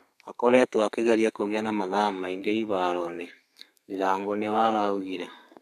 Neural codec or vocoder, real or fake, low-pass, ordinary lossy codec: codec, 32 kHz, 1.9 kbps, SNAC; fake; 14.4 kHz; none